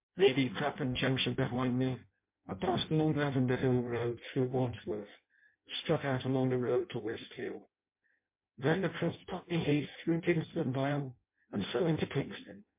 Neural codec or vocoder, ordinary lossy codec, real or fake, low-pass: codec, 16 kHz in and 24 kHz out, 0.6 kbps, FireRedTTS-2 codec; MP3, 24 kbps; fake; 3.6 kHz